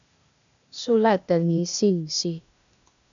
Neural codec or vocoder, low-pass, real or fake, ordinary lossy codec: codec, 16 kHz, 0.8 kbps, ZipCodec; 7.2 kHz; fake; MP3, 96 kbps